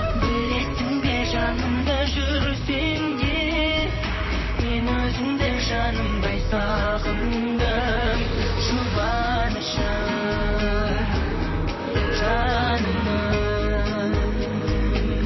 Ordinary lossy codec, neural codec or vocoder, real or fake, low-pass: MP3, 24 kbps; vocoder, 44.1 kHz, 128 mel bands, Pupu-Vocoder; fake; 7.2 kHz